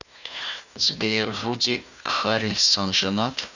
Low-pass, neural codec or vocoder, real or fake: 7.2 kHz; codec, 16 kHz, 1 kbps, FunCodec, trained on Chinese and English, 50 frames a second; fake